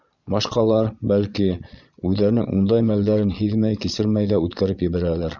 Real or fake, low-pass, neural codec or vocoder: fake; 7.2 kHz; vocoder, 22.05 kHz, 80 mel bands, Vocos